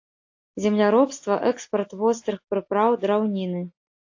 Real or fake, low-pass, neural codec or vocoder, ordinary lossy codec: real; 7.2 kHz; none; AAC, 48 kbps